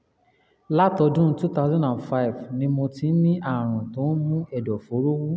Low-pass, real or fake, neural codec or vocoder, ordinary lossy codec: none; real; none; none